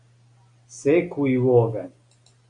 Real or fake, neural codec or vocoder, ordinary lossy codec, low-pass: real; none; Opus, 64 kbps; 9.9 kHz